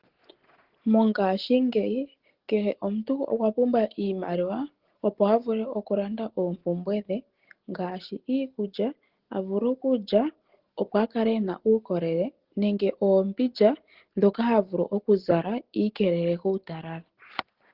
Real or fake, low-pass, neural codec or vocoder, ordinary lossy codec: real; 5.4 kHz; none; Opus, 16 kbps